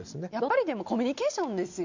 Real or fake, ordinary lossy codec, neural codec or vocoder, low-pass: real; none; none; 7.2 kHz